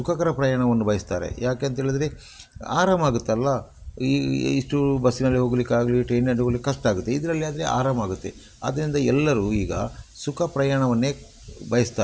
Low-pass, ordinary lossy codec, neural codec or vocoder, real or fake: none; none; none; real